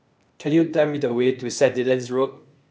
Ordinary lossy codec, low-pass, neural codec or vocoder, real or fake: none; none; codec, 16 kHz, 0.8 kbps, ZipCodec; fake